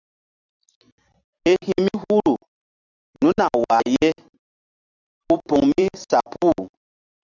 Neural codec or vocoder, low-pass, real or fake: vocoder, 44.1 kHz, 128 mel bands every 256 samples, BigVGAN v2; 7.2 kHz; fake